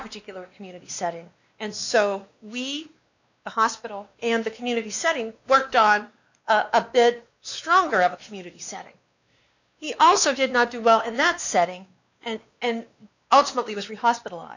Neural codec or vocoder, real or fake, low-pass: codec, 16 kHz, 2 kbps, X-Codec, WavLM features, trained on Multilingual LibriSpeech; fake; 7.2 kHz